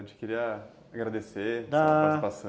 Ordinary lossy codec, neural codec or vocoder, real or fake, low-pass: none; none; real; none